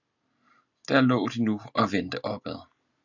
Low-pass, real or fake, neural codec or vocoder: 7.2 kHz; real; none